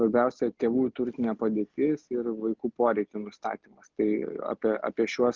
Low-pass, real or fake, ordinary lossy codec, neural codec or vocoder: 7.2 kHz; real; Opus, 24 kbps; none